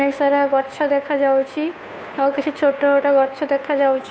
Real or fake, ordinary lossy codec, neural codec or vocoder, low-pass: fake; none; codec, 16 kHz, 2 kbps, FunCodec, trained on Chinese and English, 25 frames a second; none